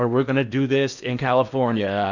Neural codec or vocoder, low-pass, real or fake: codec, 16 kHz in and 24 kHz out, 0.8 kbps, FocalCodec, streaming, 65536 codes; 7.2 kHz; fake